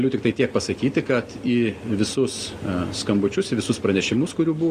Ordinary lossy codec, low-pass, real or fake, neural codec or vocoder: Opus, 64 kbps; 14.4 kHz; real; none